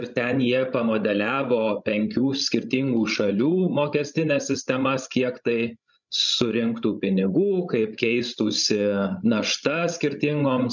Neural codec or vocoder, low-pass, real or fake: codec, 16 kHz, 16 kbps, FreqCodec, larger model; 7.2 kHz; fake